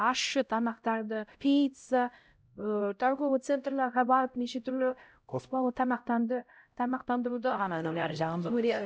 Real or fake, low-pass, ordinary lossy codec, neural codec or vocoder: fake; none; none; codec, 16 kHz, 0.5 kbps, X-Codec, HuBERT features, trained on LibriSpeech